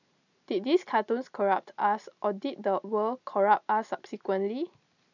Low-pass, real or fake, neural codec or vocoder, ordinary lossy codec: 7.2 kHz; real; none; none